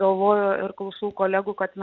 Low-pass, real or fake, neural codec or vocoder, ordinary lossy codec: 7.2 kHz; real; none; Opus, 24 kbps